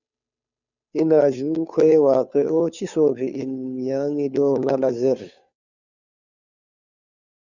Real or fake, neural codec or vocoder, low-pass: fake; codec, 16 kHz, 2 kbps, FunCodec, trained on Chinese and English, 25 frames a second; 7.2 kHz